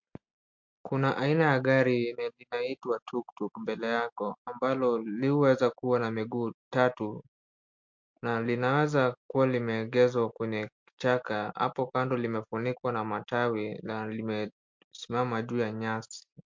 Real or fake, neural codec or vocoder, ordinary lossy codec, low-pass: real; none; MP3, 48 kbps; 7.2 kHz